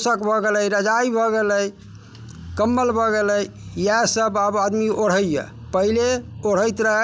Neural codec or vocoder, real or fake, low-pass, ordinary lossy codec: none; real; none; none